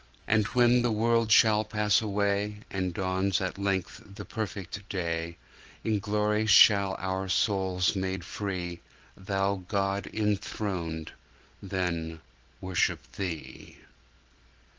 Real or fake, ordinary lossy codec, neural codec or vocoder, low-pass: real; Opus, 16 kbps; none; 7.2 kHz